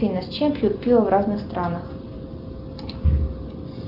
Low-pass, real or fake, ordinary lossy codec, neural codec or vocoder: 5.4 kHz; real; Opus, 24 kbps; none